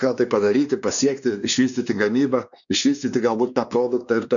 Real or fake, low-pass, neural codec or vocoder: fake; 7.2 kHz; codec, 16 kHz, 2 kbps, X-Codec, WavLM features, trained on Multilingual LibriSpeech